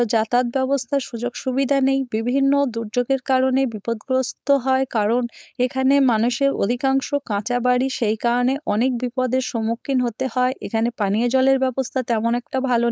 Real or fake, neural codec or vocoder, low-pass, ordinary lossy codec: fake; codec, 16 kHz, 4.8 kbps, FACodec; none; none